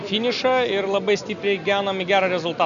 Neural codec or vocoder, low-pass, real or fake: none; 7.2 kHz; real